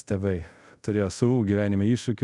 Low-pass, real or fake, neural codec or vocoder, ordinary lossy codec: 10.8 kHz; fake; codec, 24 kHz, 0.5 kbps, DualCodec; MP3, 96 kbps